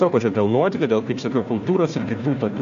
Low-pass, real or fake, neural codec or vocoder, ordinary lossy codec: 7.2 kHz; fake; codec, 16 kHz, 1 kbps, FunCodec, trained on Chinese and English, 50 frames a second; MP3, 48 kbps